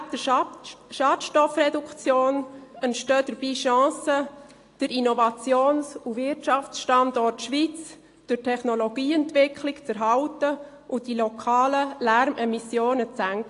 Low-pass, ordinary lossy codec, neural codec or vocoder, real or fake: 10.8 kHz; AAC, 48 kbps; none; real